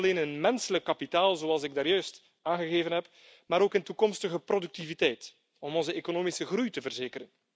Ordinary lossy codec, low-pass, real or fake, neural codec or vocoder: none; none; real; none